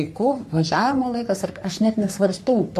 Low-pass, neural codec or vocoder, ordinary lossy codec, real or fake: 14.4 kHz; codec, 44.1 kHz, 3.4 kbps, Pupu-Codec; MP3, 64 kbps; fake